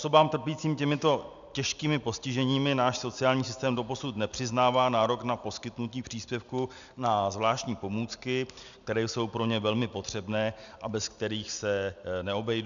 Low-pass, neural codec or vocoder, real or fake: 7.2 kHz; none; real